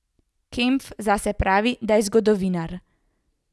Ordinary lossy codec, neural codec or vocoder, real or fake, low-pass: none; none; real; none